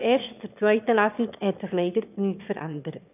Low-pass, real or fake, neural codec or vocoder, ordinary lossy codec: 3.6 kHz; fake; autoencoder, 22.05 kHz, a latent of 192 numbers a frame, VITS, trained on one speaker; none